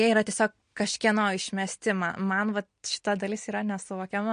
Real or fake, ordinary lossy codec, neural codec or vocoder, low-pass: real; MP3, 48 kbps; none; 9.9 kHz